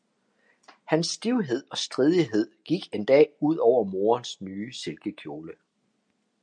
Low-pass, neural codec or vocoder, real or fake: 9.9 kHz; none; real